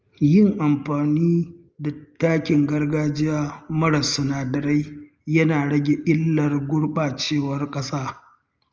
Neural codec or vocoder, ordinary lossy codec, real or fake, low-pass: none; Opus, 24 kbps; real; 7.2 kHz